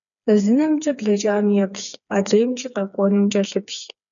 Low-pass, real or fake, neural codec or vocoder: 7.2 kHz; fake; codec, 16 kHz, 4 kbps, FreqCodec, smaller model